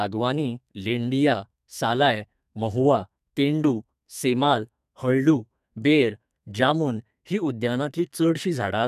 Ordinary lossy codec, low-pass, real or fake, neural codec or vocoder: none; 14.4 kHz; fake; codec, 32 kHz, 1.9 kbps, SNAC